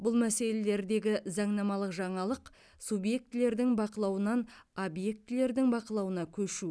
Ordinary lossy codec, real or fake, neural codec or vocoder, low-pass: none; real; none; none